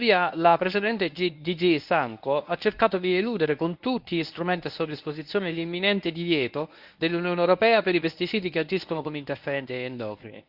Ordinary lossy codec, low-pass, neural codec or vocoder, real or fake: Opus, 64 kbps; 5.4 kHz; codec, 24 kHz, 0.9 kbps, WavTokenizer, medium speech release version 1; fake